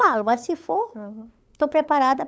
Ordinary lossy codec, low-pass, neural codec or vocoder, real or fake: none; none; codec, 16 kHz, 8 kbps, FunCodec, trained on LibriTTS, 25 frames a second; fake